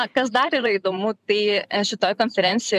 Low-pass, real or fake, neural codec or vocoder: 14.4 kHz; fake; vocoder, 44.1 kHz, 128 mel bands every 512 samples, BigVGAN v2